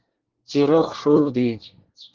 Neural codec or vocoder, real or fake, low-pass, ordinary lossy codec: codec, 24 kHz, 1 kbps, SNAC; fake; 7.2 kHz; Opus, 16 kbps